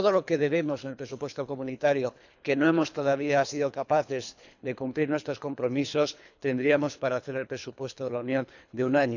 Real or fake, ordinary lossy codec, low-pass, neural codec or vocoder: fake; none; 7.2 kHz; codec, 24 kHz, 3 kbps, HILCodec